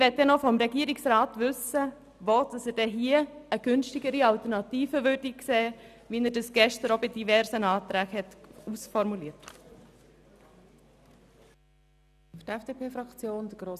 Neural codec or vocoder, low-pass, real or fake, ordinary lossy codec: none; 14.4 kHz; real; none